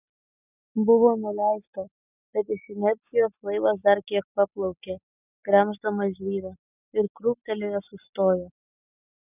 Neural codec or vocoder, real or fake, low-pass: none; real; 3.6 kHz